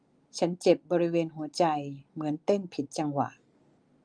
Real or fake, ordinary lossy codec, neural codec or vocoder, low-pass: real; Opus, 32 kbps; none; 9.9 kHz